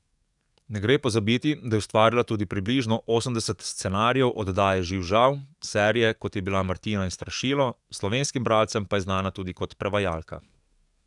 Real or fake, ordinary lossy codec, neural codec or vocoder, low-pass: fake; none; codec, 44.1 kHz, 7.8 kbps, DAC; 10.8 kHz